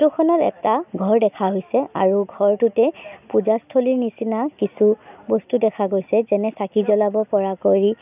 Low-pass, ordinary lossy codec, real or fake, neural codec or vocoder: 3.6 kHz; none; real; none